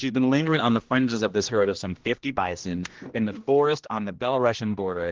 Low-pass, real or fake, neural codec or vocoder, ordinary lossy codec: 7.2 kHz; fake; codec, 16 kHz, 1 kbps, X-Codec, HuBERT features, trained on general audio; Opus, 16 kbps